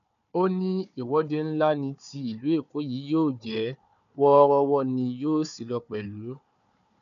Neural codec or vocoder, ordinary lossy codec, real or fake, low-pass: codec, 16 kHz, 4 kbps, FunCodec, trained on Chinese and English, 50 frames a second; none; fake; 7.2 kHz